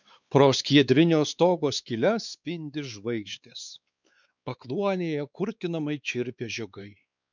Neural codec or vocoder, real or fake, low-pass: codec, 16 kHz, 4 kbps, X-Codec, WavLM features, trained on Multilingual LibriSpeech; fake; 7.2 kHz